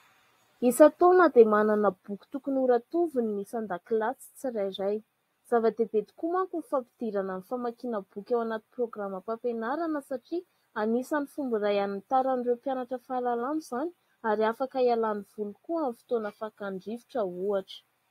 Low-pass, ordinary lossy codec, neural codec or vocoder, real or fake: 19.8 kHz; AAC, 48 kbps; none; real